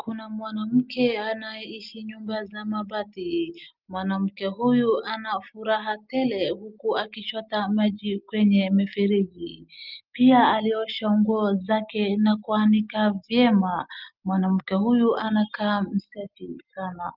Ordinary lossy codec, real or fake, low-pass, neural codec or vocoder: Opus, 24 kbps; real; 5.4 kHz; none